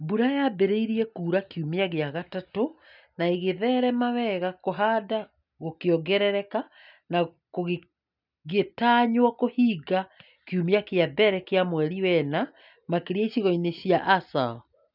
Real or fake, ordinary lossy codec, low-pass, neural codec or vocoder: real; none; 5.4 kHz; none